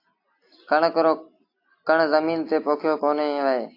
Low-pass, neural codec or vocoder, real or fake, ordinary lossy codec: 5.4 kHz; none; real; AAC, 32 kbps